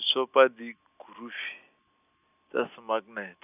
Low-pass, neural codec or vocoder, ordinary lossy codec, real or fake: 3.6 kHz; none; none; real